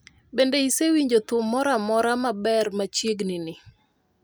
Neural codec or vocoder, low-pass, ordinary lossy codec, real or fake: none; none; none; real